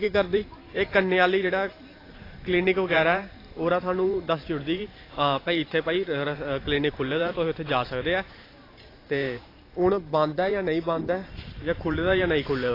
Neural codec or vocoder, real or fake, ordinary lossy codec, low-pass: vocoder, 44.1 kHz, 128 mel bands every 512 samples, BigVGAN v2; fake; AAC, 24 kbps; 5.4 kHz